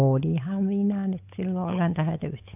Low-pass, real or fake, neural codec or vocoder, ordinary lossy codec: 3.6 kHz; fake; codec, 16 kHz, 8 kbps, FunCodec, trained on Chinese and English, 25 frames a second; AAC, 32 kbps